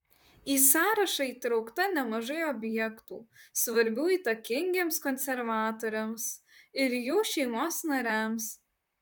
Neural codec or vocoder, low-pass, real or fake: vocoder, 44.1 kHz, 128 mel bands, Pupu-Vocoder; 19.8 kHz; fake